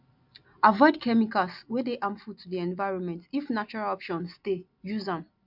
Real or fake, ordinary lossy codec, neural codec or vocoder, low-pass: real; MP3, 48 kbps; none; 5.4 kHz